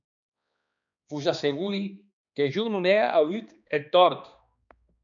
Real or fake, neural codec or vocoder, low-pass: fake; codec, 16 kHz, 2 kbps, X-Codec, HuBERT features, trained on balanced general audio; 7.2 kHz